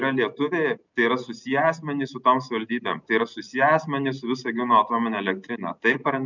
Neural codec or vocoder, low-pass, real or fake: none; 7.2 kHz; real